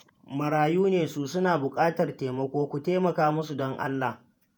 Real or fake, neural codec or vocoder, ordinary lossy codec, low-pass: fake; vocoder, 48 kHz, 128 mel bands, Vocos; none; none